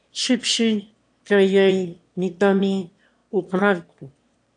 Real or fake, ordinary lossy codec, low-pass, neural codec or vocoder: fake; AAC, 48 kbps; 9.9 kHz; autoencoder, 22.05 kHz, a latent of 192 numbers a frame, VITS, trained on one speaker